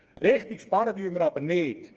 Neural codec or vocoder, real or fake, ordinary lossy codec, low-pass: codec, 16 kHz, 2 kbps, FreqCodec, smaller model; fake; Opus, 24 kbps; 7.2 kHz